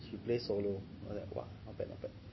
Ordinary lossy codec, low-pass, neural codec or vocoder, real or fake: MP3, 24 kbps; 7.2 kHz; none; real